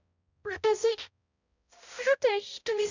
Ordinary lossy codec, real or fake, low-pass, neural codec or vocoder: none; fake; 7.2 kHz; codec, 16 kHz, 0.5 kbps, X-Codec, HuBERT features, trained on balanced general audio